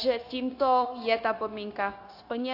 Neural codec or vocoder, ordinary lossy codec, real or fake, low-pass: codec, 16 kHz, 0.9 kbps, LongCat-Audio-Codec; AAC, 32 kbps; fake; 5.4 kHz